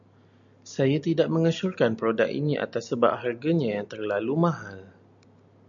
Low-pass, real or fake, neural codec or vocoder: 7.2 kHz; real; none